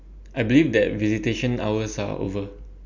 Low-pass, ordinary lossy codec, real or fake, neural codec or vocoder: 7.2 kHz; none; real; none